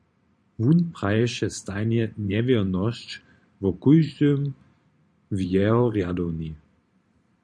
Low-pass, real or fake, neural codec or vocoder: 9.9 kHz; fake; vocoder, 44.1 kHz, 128 mel bands every 512 samples, BigVGAN v2